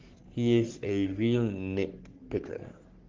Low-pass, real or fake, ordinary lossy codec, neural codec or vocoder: 7.2 kHz; fake; Opus, 16 kbps; codec, 44.1 kHz, 3.4 kbps, Pupu-Codec